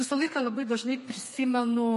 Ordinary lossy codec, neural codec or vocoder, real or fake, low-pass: MP3, 48 kbps; codec, 32 kHz, 1.9 kbps, SNAC; fake; 14.4 kHz